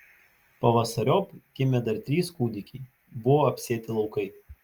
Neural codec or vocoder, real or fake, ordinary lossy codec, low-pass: none; real; Opus, 32 kbps; 19.8 kHz